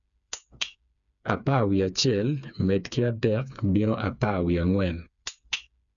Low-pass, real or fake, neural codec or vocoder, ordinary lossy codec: 7.2 kHz; fake; codec, 16 kHz, 4 kbps, FreqCodec, smaller model; none